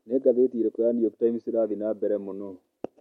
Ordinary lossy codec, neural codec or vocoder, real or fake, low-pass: MP3, 64 kbps; none; real; 19.8 kHz